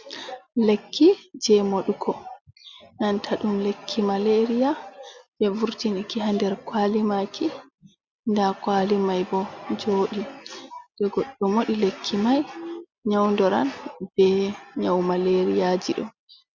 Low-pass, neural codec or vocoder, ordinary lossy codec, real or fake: 7.2 kHz; none; Opus, 64 kbps; real